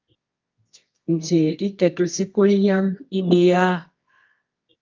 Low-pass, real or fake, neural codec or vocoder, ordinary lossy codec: 7.2 kHz; fake; codec, 24 kHz, 0.9 kbps, WavTokenizer, medium music audio release; Opus, 24 kbps